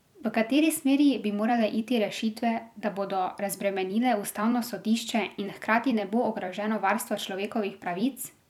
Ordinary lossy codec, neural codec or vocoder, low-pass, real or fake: none; vocoder, 44.1 kHz, 128 mel bands every 256 samples, BigVGAN v2; 19.8 kHz; fake